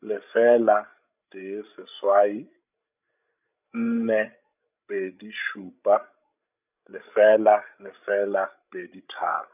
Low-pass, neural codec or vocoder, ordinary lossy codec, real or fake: 3.6 kHz; codec, 16 kHz, 16 kbps, FreqCodec, larger model; none; fake